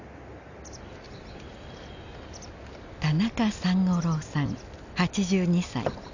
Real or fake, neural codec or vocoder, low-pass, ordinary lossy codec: real; none; 7.2 kHz; none